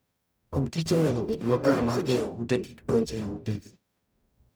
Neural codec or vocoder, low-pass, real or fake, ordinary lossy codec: codec, 44.1 kHz, 0.9 kbps, DAC; none; fake; none